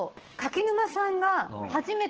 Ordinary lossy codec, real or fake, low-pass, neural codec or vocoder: Opus, 16 kbps; fake; 7.2 kHz; codec, 16 kHz, 6 kbps, DAC